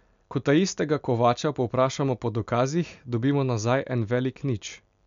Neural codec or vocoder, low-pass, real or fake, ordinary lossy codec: none; 7.2 kHz; real; MP3, 64 kbps